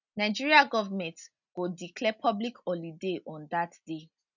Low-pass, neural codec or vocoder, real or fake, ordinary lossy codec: 7.2 kHz; none; real; none